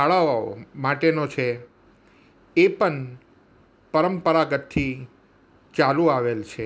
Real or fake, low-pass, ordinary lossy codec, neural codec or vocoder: real; none; none; none